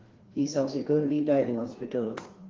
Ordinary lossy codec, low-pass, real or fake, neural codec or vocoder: Opus, 16 kbps; 7.2 kHz; fake; codec, 16 kHz, 2 kbps, FreqCodec, larger model